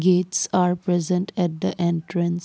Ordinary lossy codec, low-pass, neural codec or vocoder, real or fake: none; none; none; real